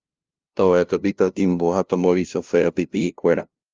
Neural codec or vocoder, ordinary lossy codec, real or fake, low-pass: codec, 16 kHz, 0.5 kbps, FunCodec, trained on LibriTTS, 25 frames a second; Opus, 24 kbps; fake; 7.2 kHz